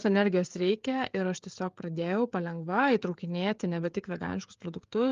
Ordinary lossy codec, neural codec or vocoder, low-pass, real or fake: Opus, 32 kbps; codec, 16 kHz, 16 kbps, FreqCodec, smaller model; 7.2 kHz; fake